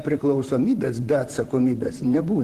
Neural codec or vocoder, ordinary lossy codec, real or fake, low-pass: vocoder, 44.1 kHz, 128 mel bands, Pupu-Vocoder; Opus, 16 kbps; fake; 14.4 kHz